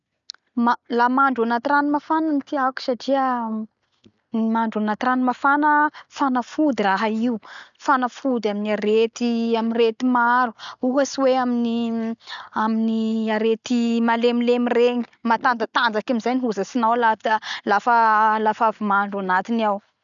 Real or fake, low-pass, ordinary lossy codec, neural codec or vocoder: real; 7.2 kHz; none; none